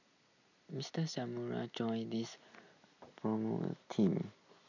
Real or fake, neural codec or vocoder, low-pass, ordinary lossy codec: real; none; 7.2 kHz; none